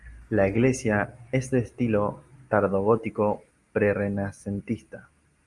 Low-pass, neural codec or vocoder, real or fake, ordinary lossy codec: 10.8 kHz; none; real; Opus, 32 kbps